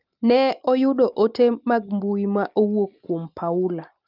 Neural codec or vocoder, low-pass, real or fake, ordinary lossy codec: none; 5.4 kHz; real; Opus, 24 kbps